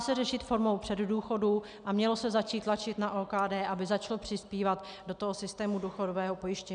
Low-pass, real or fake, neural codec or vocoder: 9.9 kHz; real; none